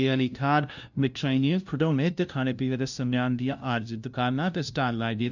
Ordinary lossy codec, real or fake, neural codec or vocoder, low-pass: none; fake; codec, 16 kHz, 0.5 kbps, FunCodec, trained on LibriTTS, 25 frames a second; 7.2 kHz